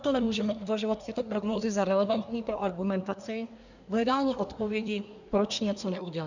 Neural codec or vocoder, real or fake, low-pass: codec, 24 kHz, 1 kbps, SNAC; fake; 7.2 kHz